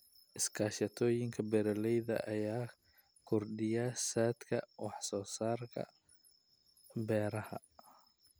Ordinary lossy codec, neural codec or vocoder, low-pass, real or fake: none; none; none; real